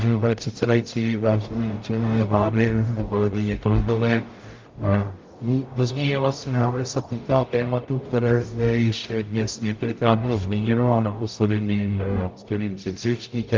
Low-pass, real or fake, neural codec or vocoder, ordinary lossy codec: 7.2 kHz; fake; codec, 44.1 kHz, 0.9 kbps, DAC; Opus, 24 kbps